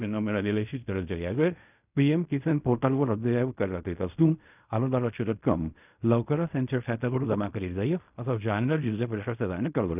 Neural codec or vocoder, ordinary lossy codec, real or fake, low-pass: codec, 16 kHz in and 24 kHz out, 0.4 kbps, LongCat-Audio-Codec, fine tuned four codebook decoder; none; fake; 3.6 kHz